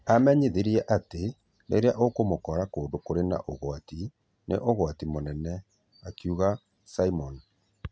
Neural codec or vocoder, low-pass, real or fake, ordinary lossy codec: none; none; real; none